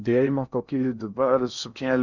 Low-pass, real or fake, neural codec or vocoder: 7.2 kHz; fake; codec, 16 kHz in and 24 kHz out, 0.6 kbps, FocalCodec, streaming, 2048 codes